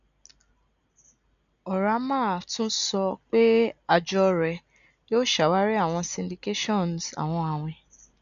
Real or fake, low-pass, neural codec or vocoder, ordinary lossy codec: real; 7.2 kHz; none; none